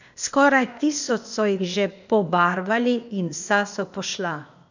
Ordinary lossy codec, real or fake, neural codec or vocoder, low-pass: none; fake; codec, 16 kHz, 0.8 kbps, ZipCodec; 7.2 kHz